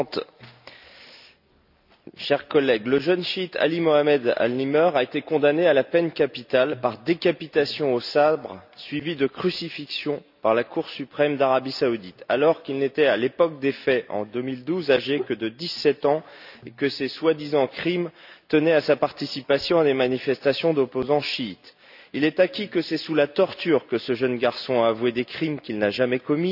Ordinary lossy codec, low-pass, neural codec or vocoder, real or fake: none; 5.4 kHz; none; real